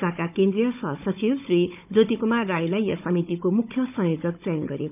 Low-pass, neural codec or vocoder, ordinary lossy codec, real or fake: 3.6 kHz; codec, 16 kHz, 16 kbps, FunCodec, trained on Chinese and English, 50 frames a second; none; fake